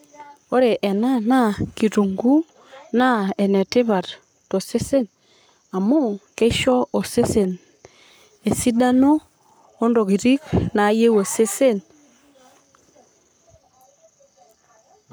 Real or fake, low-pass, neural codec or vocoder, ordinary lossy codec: fake; none; codec, 44.1 kHz, 7.8 kbps, Pupu-Codec; none